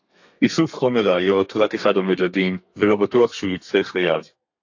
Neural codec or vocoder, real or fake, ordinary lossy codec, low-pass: codec, 32 kHz, 1.9 kbps, SNAC; fake; AAC, 48 kbps; 7.2 kHz